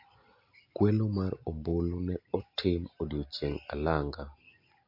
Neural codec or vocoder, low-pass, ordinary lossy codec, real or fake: none; 5.4 kHz; MP3, 32 kbps; real